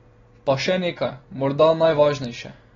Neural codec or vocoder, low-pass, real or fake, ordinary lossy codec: none; 7.2 kHz; real; AAC, 24 kbps